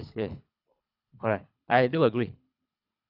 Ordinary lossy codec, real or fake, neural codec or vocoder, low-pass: none; fake; codec, 24 kHz, 3 kbps, HILCodec; 5.4 kHz